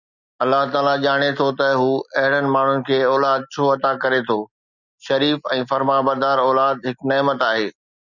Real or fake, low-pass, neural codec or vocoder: real; 7.2 kHz; none